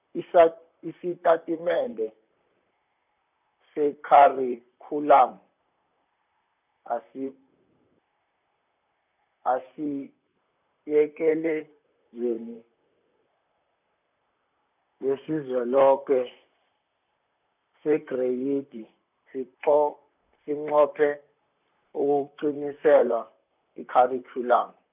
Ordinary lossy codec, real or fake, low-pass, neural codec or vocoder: none; fake; 3.6 kHz; vocoder, 44.1 kHz, 128 mel bands, Pupu-Vocoder